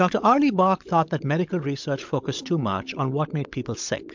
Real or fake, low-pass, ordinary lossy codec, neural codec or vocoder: fake; 7.2 kHz; MP3, 64 kbps; codec, 16 kHz, 16 kbps, FunCodec, trained on LibriTTS, 50 frames a second